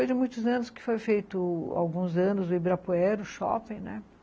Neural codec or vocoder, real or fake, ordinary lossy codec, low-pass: none; real; none; none